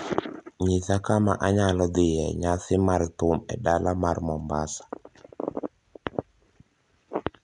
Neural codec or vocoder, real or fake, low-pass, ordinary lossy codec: none; real; 10.8 kHz; none